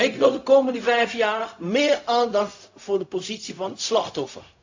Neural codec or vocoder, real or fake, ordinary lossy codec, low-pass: codec, 16 kHz, 0.4 kbps, LongCat-Audio-Codec; fake; AAC, 48 kbps; 7.2 kHz